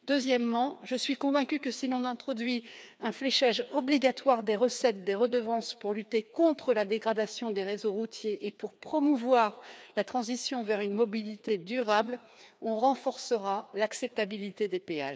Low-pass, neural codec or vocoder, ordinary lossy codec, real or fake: none; codec, 16 kHz, 2 kbps, FreqCodec, larger model; none; fake